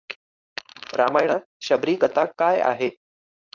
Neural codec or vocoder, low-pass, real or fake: codec, 16 kHz, 4.8 kbps, FACodec; 7.2 kHz; fake